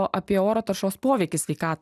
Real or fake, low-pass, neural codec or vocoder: real; 14.4 kHz; none